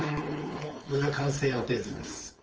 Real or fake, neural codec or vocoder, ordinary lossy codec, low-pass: fake; codec, 16 kHz, 4.8 kbps, FACodec; Opus, 24 kbps; 7.2 kHz